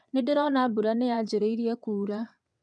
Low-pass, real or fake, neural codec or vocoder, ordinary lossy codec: 9.9 kHz; fake; vocoder, 22.05 kHz, 80 mel bands, WaveNeXt; none